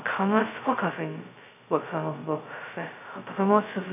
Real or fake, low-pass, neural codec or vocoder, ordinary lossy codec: fake; 3.6 kHz; codec, 16 kHz, 0.2 kbps, FocalCodec; none